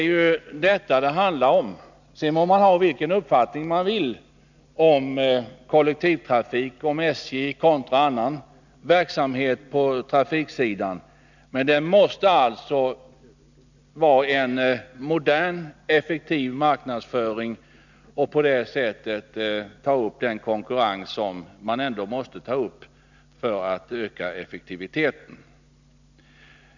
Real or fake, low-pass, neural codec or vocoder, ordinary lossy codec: real; 7.2 kHz; none; none